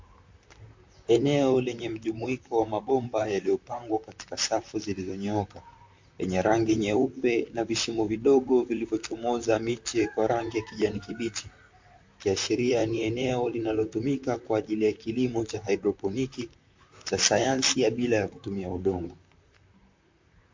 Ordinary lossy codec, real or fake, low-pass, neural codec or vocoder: MP3, 48 kbps; fake; 7.2 kHz; vocoder, 44.1 kHz, 128 mel bands, Pupu-Vocoder